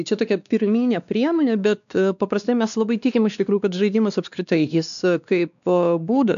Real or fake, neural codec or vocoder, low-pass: fake; codec, 16 kHz, 2 kbps, X-Codec, WavLM features, trained on Multilingual LibriSpeech; 7.2 kHz